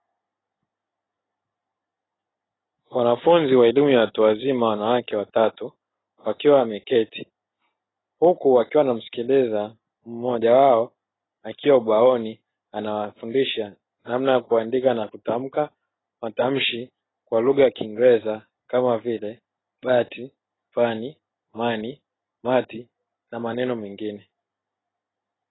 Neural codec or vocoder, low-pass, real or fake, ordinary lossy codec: none; 7.2 kHz; real; AAC, 16 kbps